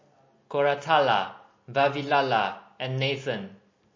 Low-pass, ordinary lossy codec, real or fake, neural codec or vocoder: 7.2 kHz; MP3, 32 kbps; real; none